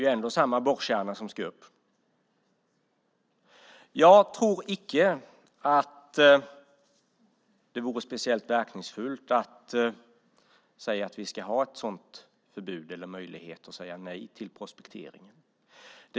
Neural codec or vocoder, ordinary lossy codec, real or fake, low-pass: none; none; real; none